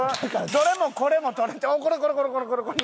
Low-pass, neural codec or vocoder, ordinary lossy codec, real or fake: none; none; none; real